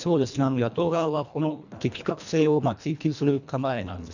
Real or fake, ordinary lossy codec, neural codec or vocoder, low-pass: fake; none; codec, 24 kHz, 1.5 kbps, HILCodec; 7.2 kHz